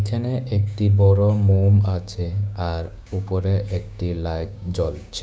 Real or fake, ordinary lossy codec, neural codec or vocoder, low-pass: fake; none; codec, 16 kHz, 6 kbps, DAC; none